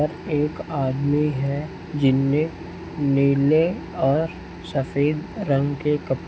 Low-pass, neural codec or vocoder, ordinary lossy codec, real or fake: none; none; none; real